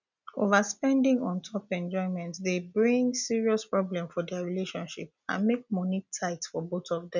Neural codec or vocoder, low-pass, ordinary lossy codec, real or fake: none; 7.2 kHz; none; real